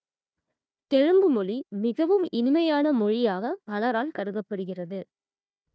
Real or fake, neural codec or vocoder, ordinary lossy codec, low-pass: fake; codec, 16 kHz, 1 kbps, FunCodec, trained on Chinese and English, 50 frames a second; none; none